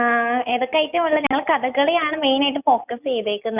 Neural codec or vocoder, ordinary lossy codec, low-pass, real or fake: none; none; 3.6 kHz; real